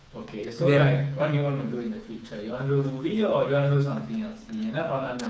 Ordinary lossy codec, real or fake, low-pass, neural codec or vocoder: none; fake; none; codec, 16 kHz, 4 kbps, FreqCodec, smaller model